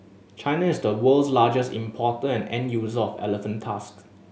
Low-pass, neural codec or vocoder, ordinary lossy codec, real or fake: none; none; none; real